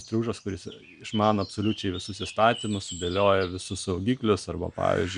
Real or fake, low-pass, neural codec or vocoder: real; 9.9 kHz; none